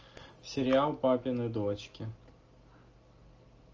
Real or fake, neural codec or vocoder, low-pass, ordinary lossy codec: real; none; 7.2 kHz; Opus, 24 kbps